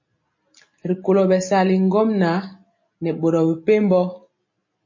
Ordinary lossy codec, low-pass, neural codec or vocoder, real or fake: MP3, 32 kbps; 7.2 kHz; none; real